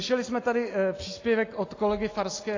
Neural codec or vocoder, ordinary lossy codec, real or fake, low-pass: none; AAC, 32 kbps; real; 7.2 kHz